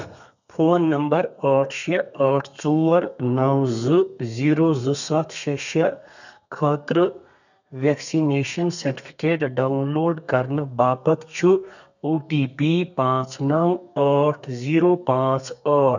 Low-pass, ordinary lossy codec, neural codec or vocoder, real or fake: 7.2 kHz; none; codec, 32 kHz, 1.9 kbps, SNAC; fake